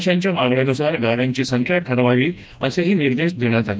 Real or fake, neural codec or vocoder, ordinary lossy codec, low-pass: fake; codec, 16 kHz, 1 kbps, FreqCodec, smaller model; none; none